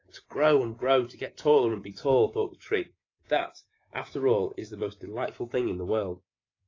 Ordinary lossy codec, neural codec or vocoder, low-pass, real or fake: AAC, 32 kbps; codec, 24 kHz, 3.1 kbps, DualCodec; 7.2 kHz; fake